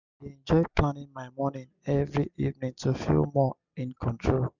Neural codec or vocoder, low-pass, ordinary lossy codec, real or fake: none; 7.2 kHz; none; real